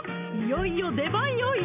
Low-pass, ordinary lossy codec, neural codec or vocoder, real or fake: 3.6 kHz; none; none; real